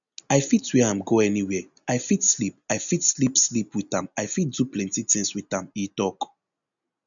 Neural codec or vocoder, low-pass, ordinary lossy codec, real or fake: none; 7.2 kHz; none; real